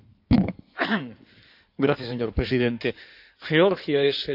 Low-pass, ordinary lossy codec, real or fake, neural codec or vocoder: 5.4 kHz; none; fake; codec, 16 kHz in and 24 kHz out, 2.2 kbps, FireRedTTS-2 codec